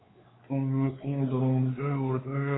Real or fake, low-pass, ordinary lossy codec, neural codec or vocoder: fake; 7.2 kHz; AAC, 16 kbps; codec, 16 kHz, 4 kbps, X-Codec, WavLM features, trained on Multilingual LibriSpeech